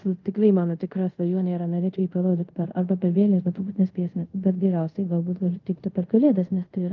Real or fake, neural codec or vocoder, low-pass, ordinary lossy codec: fake; codec, 24 kHz, 0.5 kbps, DualCodec; 7.2 kHz; Opus, 24 kbps